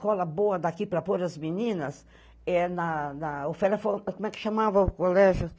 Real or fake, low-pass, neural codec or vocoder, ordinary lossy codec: real; none; none; none